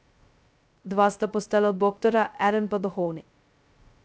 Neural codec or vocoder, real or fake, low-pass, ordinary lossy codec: codec, 16 kHz, 0.2 kbps, FocalCodec; fake; none; none